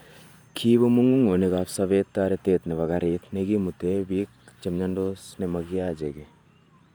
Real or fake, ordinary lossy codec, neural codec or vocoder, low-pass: fake; none; vocoder, 44.1 kHz, 128 mel bands every 512 samples, BigVGAN v2; 19.8 kHz